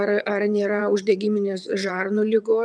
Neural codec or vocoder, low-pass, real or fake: vocoder, 22.05 kHz, 80 mel bands, WaveNeXt; 9.9 kHz; fake